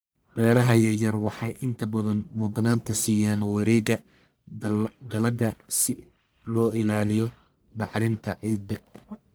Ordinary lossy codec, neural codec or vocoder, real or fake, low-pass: none; codec, 44.1 kHz, 1.7 kbps, Pupu-Codec; fake; none